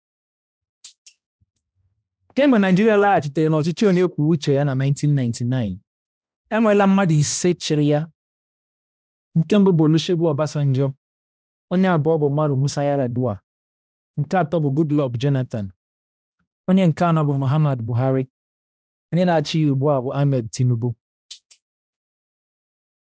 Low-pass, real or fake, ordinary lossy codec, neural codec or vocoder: none; fake; none; codec, 16 kHz, 1 kbps, X-Codec, HuBERT features, trained on balanced general audio